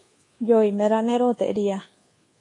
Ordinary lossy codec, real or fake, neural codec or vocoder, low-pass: AAC, 32 kbps; fake; codec, 24 kHz, 1.2 kbps, DualCodec; 10.8 kHz